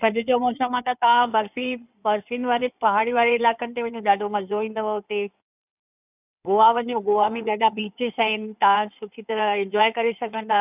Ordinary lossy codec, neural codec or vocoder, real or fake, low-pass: none; codec, 16 kHz in and 24 kHz out, 2.2 kbps, FireRedTTS-2 codec; fake; 3.6 kHz